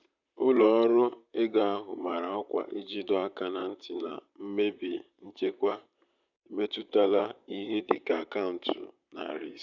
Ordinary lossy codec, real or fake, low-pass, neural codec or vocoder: none; fake; 7.2 kHz; vocoder, 44.1 kHz, 128 mel bands, Pupu-Vocoder